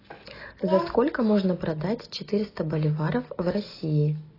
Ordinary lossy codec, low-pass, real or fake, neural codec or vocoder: AAC, 24 kbps; 5.4 kHz; real; none